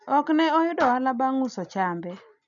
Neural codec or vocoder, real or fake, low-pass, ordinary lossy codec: none; real; 7.2 kHz; none